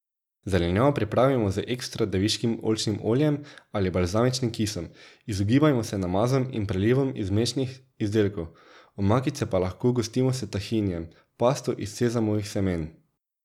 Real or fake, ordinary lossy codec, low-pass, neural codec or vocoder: real; none; 19.8 kHz; none